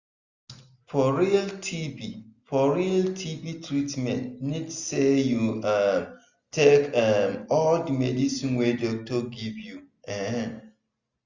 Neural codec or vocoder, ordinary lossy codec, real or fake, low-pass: none; Opus, 64 kbps; real; 7.2 kHz